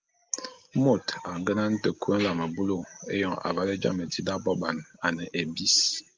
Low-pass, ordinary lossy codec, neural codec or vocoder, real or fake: 7.2 kHz; Opus, 24 kbps; none; real